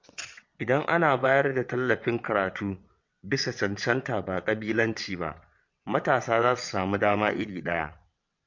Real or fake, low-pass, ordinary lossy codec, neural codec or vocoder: fake; 7.2 kHz; MP3, 48 kbps; vocoder, 22.05 kHz, 80 mel bands, WaveNeXt